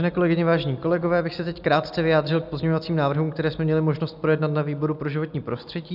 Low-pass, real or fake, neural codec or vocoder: 5.4 kHz; real; none